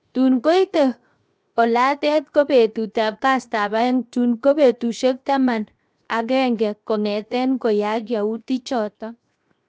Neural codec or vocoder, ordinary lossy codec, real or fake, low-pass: codec, 16 kHz, 0.7 kbps, FocalCodec; none; fake; none